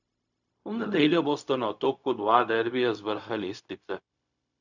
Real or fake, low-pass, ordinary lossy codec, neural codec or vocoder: fake; 7.2 kHz; none; codec, 16 kHz, 0.4 kbps, LongCat-Audio-Codec